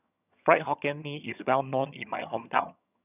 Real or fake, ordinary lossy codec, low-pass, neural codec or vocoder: fake; none; 3.6 kHz; vocoder, 22.05 kHz, 80 mel bands, HiFi-GAN